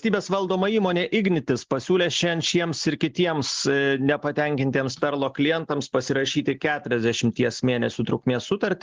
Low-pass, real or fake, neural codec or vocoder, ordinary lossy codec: 7.2 kHz; real; none; Opus, 32 kbps